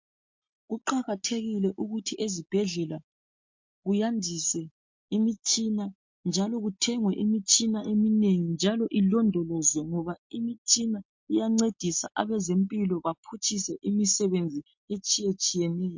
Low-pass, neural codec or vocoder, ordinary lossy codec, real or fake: 7.2 kHz; none; MP3, 48 kbps; real